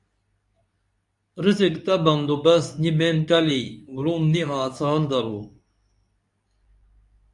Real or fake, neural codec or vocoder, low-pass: fake; codec, 24 kHz, 0.9 kbps, WavTokenizer, medium speech release version 2; 10.8 kHz